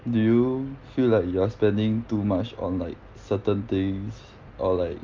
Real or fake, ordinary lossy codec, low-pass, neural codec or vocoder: real; Opus, 32 kbps; 7.2 kHz; none